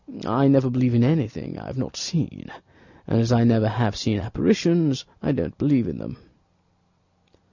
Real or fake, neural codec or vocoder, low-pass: real; none; 7.2 kHz